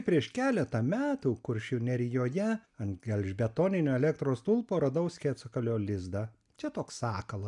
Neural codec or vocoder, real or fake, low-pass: none; real; 10.8 kHz